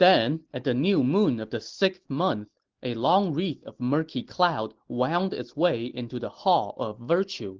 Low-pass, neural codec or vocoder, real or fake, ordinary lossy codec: 7.2 kHz; none; real; Opus, 16 kbps